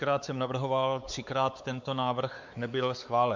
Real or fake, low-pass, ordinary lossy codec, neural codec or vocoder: fake; 7.2 kHz; MP3, 64 kbps; codec, 16 kHz, 4 kbps, X-Codec, WavLM features, trained on Multilingual LibriSpeech